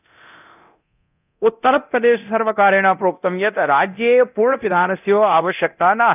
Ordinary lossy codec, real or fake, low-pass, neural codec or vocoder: none; fake; 3.6 kHz; codec, 24 kHz, 0.9 kbps, DualCodec